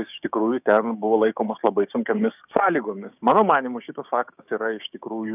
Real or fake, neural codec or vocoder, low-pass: fake; codec, 16 kHz, 6 kbps, DAC; 3.6 kHz